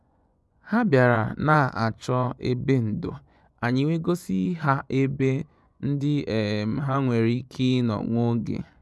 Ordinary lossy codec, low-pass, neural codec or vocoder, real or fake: none; none; vocoder, 24 kHz, 100 mel bands, Vocos; fake